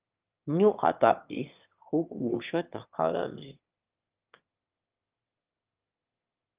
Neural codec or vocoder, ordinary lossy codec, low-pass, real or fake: autoencoder, 22.05 kHz, a latent of 192 numbers a frame, VITS, trained on one speaker; Opus, 24 kbps; 3.6 kHz; fake